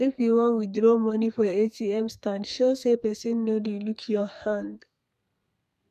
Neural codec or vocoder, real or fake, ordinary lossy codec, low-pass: codec, 32 kHz, 1.9 kbps, SNAC; fake; none; 14.4 kHz